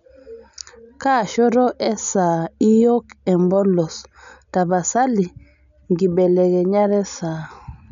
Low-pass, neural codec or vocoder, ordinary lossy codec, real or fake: 7.2 kHz; none; none; real